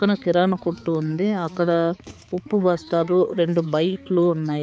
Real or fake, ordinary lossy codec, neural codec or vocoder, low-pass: fake; none; codec, 16 kHz, 4 kbps, X-Codec, HuBERT features, trained on balanced general audio; none